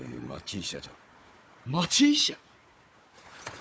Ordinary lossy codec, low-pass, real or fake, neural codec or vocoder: none; none; fake; codec, 16 kHz, 4 kbps, FunCodec, trained on Chinese and English, 50 frames a second